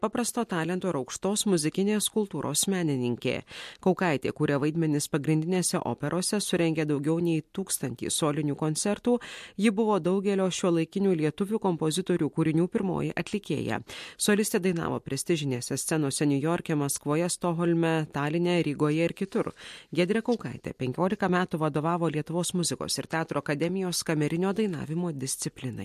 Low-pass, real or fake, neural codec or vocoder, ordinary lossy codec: 14.4 kHz; real; none; MP3, 64 kbps